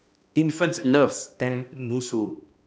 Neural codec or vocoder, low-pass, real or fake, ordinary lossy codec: codec, 16 kHz, 1 kbps, X-Codec, HuBERT features, trained on balanced general audio; none; fake; none